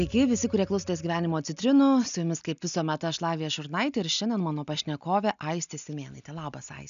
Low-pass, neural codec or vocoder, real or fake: 7.2 kHz; none; real